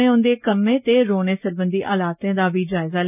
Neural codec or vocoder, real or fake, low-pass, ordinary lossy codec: none; real; 3.6 kHz; none